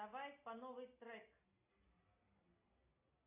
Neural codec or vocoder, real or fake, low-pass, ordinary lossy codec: none; real; 3.6 kHz; AAC, 32 kbps